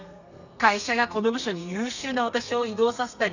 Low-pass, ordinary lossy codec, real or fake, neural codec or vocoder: 7.2 kHz; none; fake; codec, 32 kHz, 1.9 kbps, SNAC